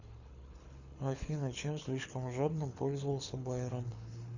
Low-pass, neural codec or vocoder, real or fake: 7.2 kHz; codec, 24 kHz, 6 kbps, HILCodec; fake